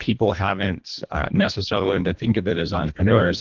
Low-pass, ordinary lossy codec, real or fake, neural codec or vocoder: 7.2 kHz; Opus, 32 kbps; fake; codec, 24 kHz, 1.5 kbps, HILCodec